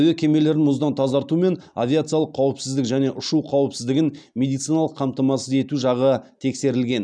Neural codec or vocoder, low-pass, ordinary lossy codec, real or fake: none; 9.9 kHz; none; real